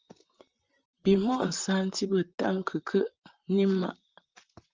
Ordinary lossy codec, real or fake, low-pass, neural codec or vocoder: Opus, 24 kbps; fake; 7.2 kHz; vocoder, 44.1 kHz, 128 mel bands, Pupu-Vocoder